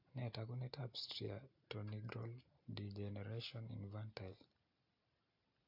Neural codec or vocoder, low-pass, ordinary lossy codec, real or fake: none; 5.4 kHz; AAC, 48 kbps; real